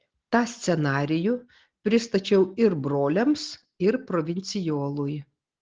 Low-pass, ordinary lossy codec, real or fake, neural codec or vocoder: 7.2 kHz; Opus, 16 kbps; real; none